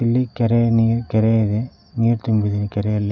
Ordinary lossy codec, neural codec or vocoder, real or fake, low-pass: none; none; real; 7.2 kHz